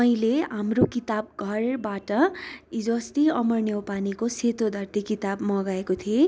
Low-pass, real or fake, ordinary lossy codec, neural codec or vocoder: none; real; none; none